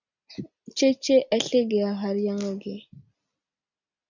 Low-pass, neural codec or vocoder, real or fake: 7.2 kHz; none; real